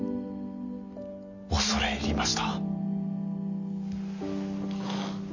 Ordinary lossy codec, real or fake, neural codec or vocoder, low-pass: none; real; none; 7.2 kHz